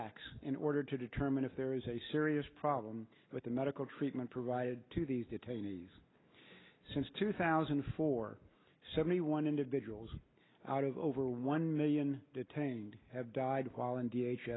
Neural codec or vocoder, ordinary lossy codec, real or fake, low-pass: none; AAC, 16 kbps; real; 7.2 kHz